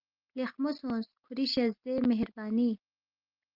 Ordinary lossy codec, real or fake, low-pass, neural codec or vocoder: Opus, 32 kbps; real; 5.4 kHz; none